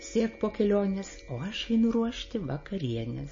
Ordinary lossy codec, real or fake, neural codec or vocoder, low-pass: MP3, 32 kbps; real; none; 7.2 kHz